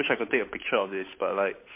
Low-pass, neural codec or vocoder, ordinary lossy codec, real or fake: 3.6 kHz; codec, 16 kHz, 8 kbps, FunCodec, trained on Chinese and English, 25 frames a second; MP3, 24 kbps; fake